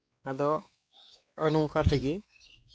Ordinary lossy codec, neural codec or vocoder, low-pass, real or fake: none; codec, 16 kHz, 2 kbps, X-Codec, WavLM features, trained on Multilingual LibriSpeech; none; fake